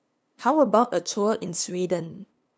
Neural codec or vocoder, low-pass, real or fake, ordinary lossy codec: codec, 16 kHz, 2 kbps, FunCodec, trained on LibriTTS, 25 frames a second; none; fake; none